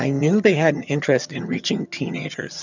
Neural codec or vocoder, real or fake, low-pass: vocoder, 22.05 kHz, 80 mel bands, HiFi-GAN; fake; 7.2 kHz